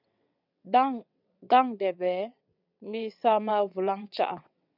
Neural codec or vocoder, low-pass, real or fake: vocoder, 22.05 kHz, 80 mel bands, WaveNeXt; 5.4 kHz; fake